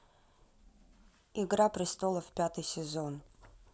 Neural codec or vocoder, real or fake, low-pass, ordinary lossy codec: none; real; none; none